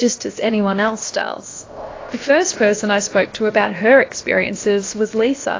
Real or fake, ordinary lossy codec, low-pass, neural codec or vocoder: fake; AAC, 32 kbps; 7.2 kHz; codec, 16 kHz, about 1 kbps, DyCAST, with the encoder's durations